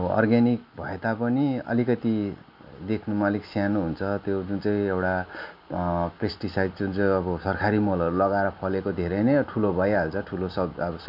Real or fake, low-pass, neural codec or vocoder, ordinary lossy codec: real; 5.4 kHz; none; none